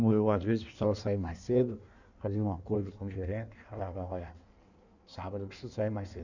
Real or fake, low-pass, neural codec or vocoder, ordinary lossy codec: fake; 7.2 kHz; codec, 16 kHz in and 24 kHz out, 1.1 kbps, FireRedTTS-2 codec; none